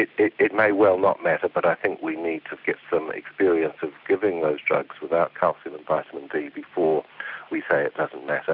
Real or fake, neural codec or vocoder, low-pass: real; none; 5.4 kHz